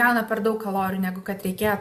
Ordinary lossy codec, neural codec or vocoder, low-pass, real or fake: AAC, 96 kbps; none; 14.4 kHz; real